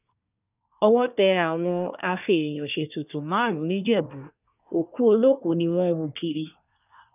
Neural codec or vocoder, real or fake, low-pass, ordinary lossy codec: codec, 24 kHz, 1 kbps, SNAC; fake; 3.6 kHz; none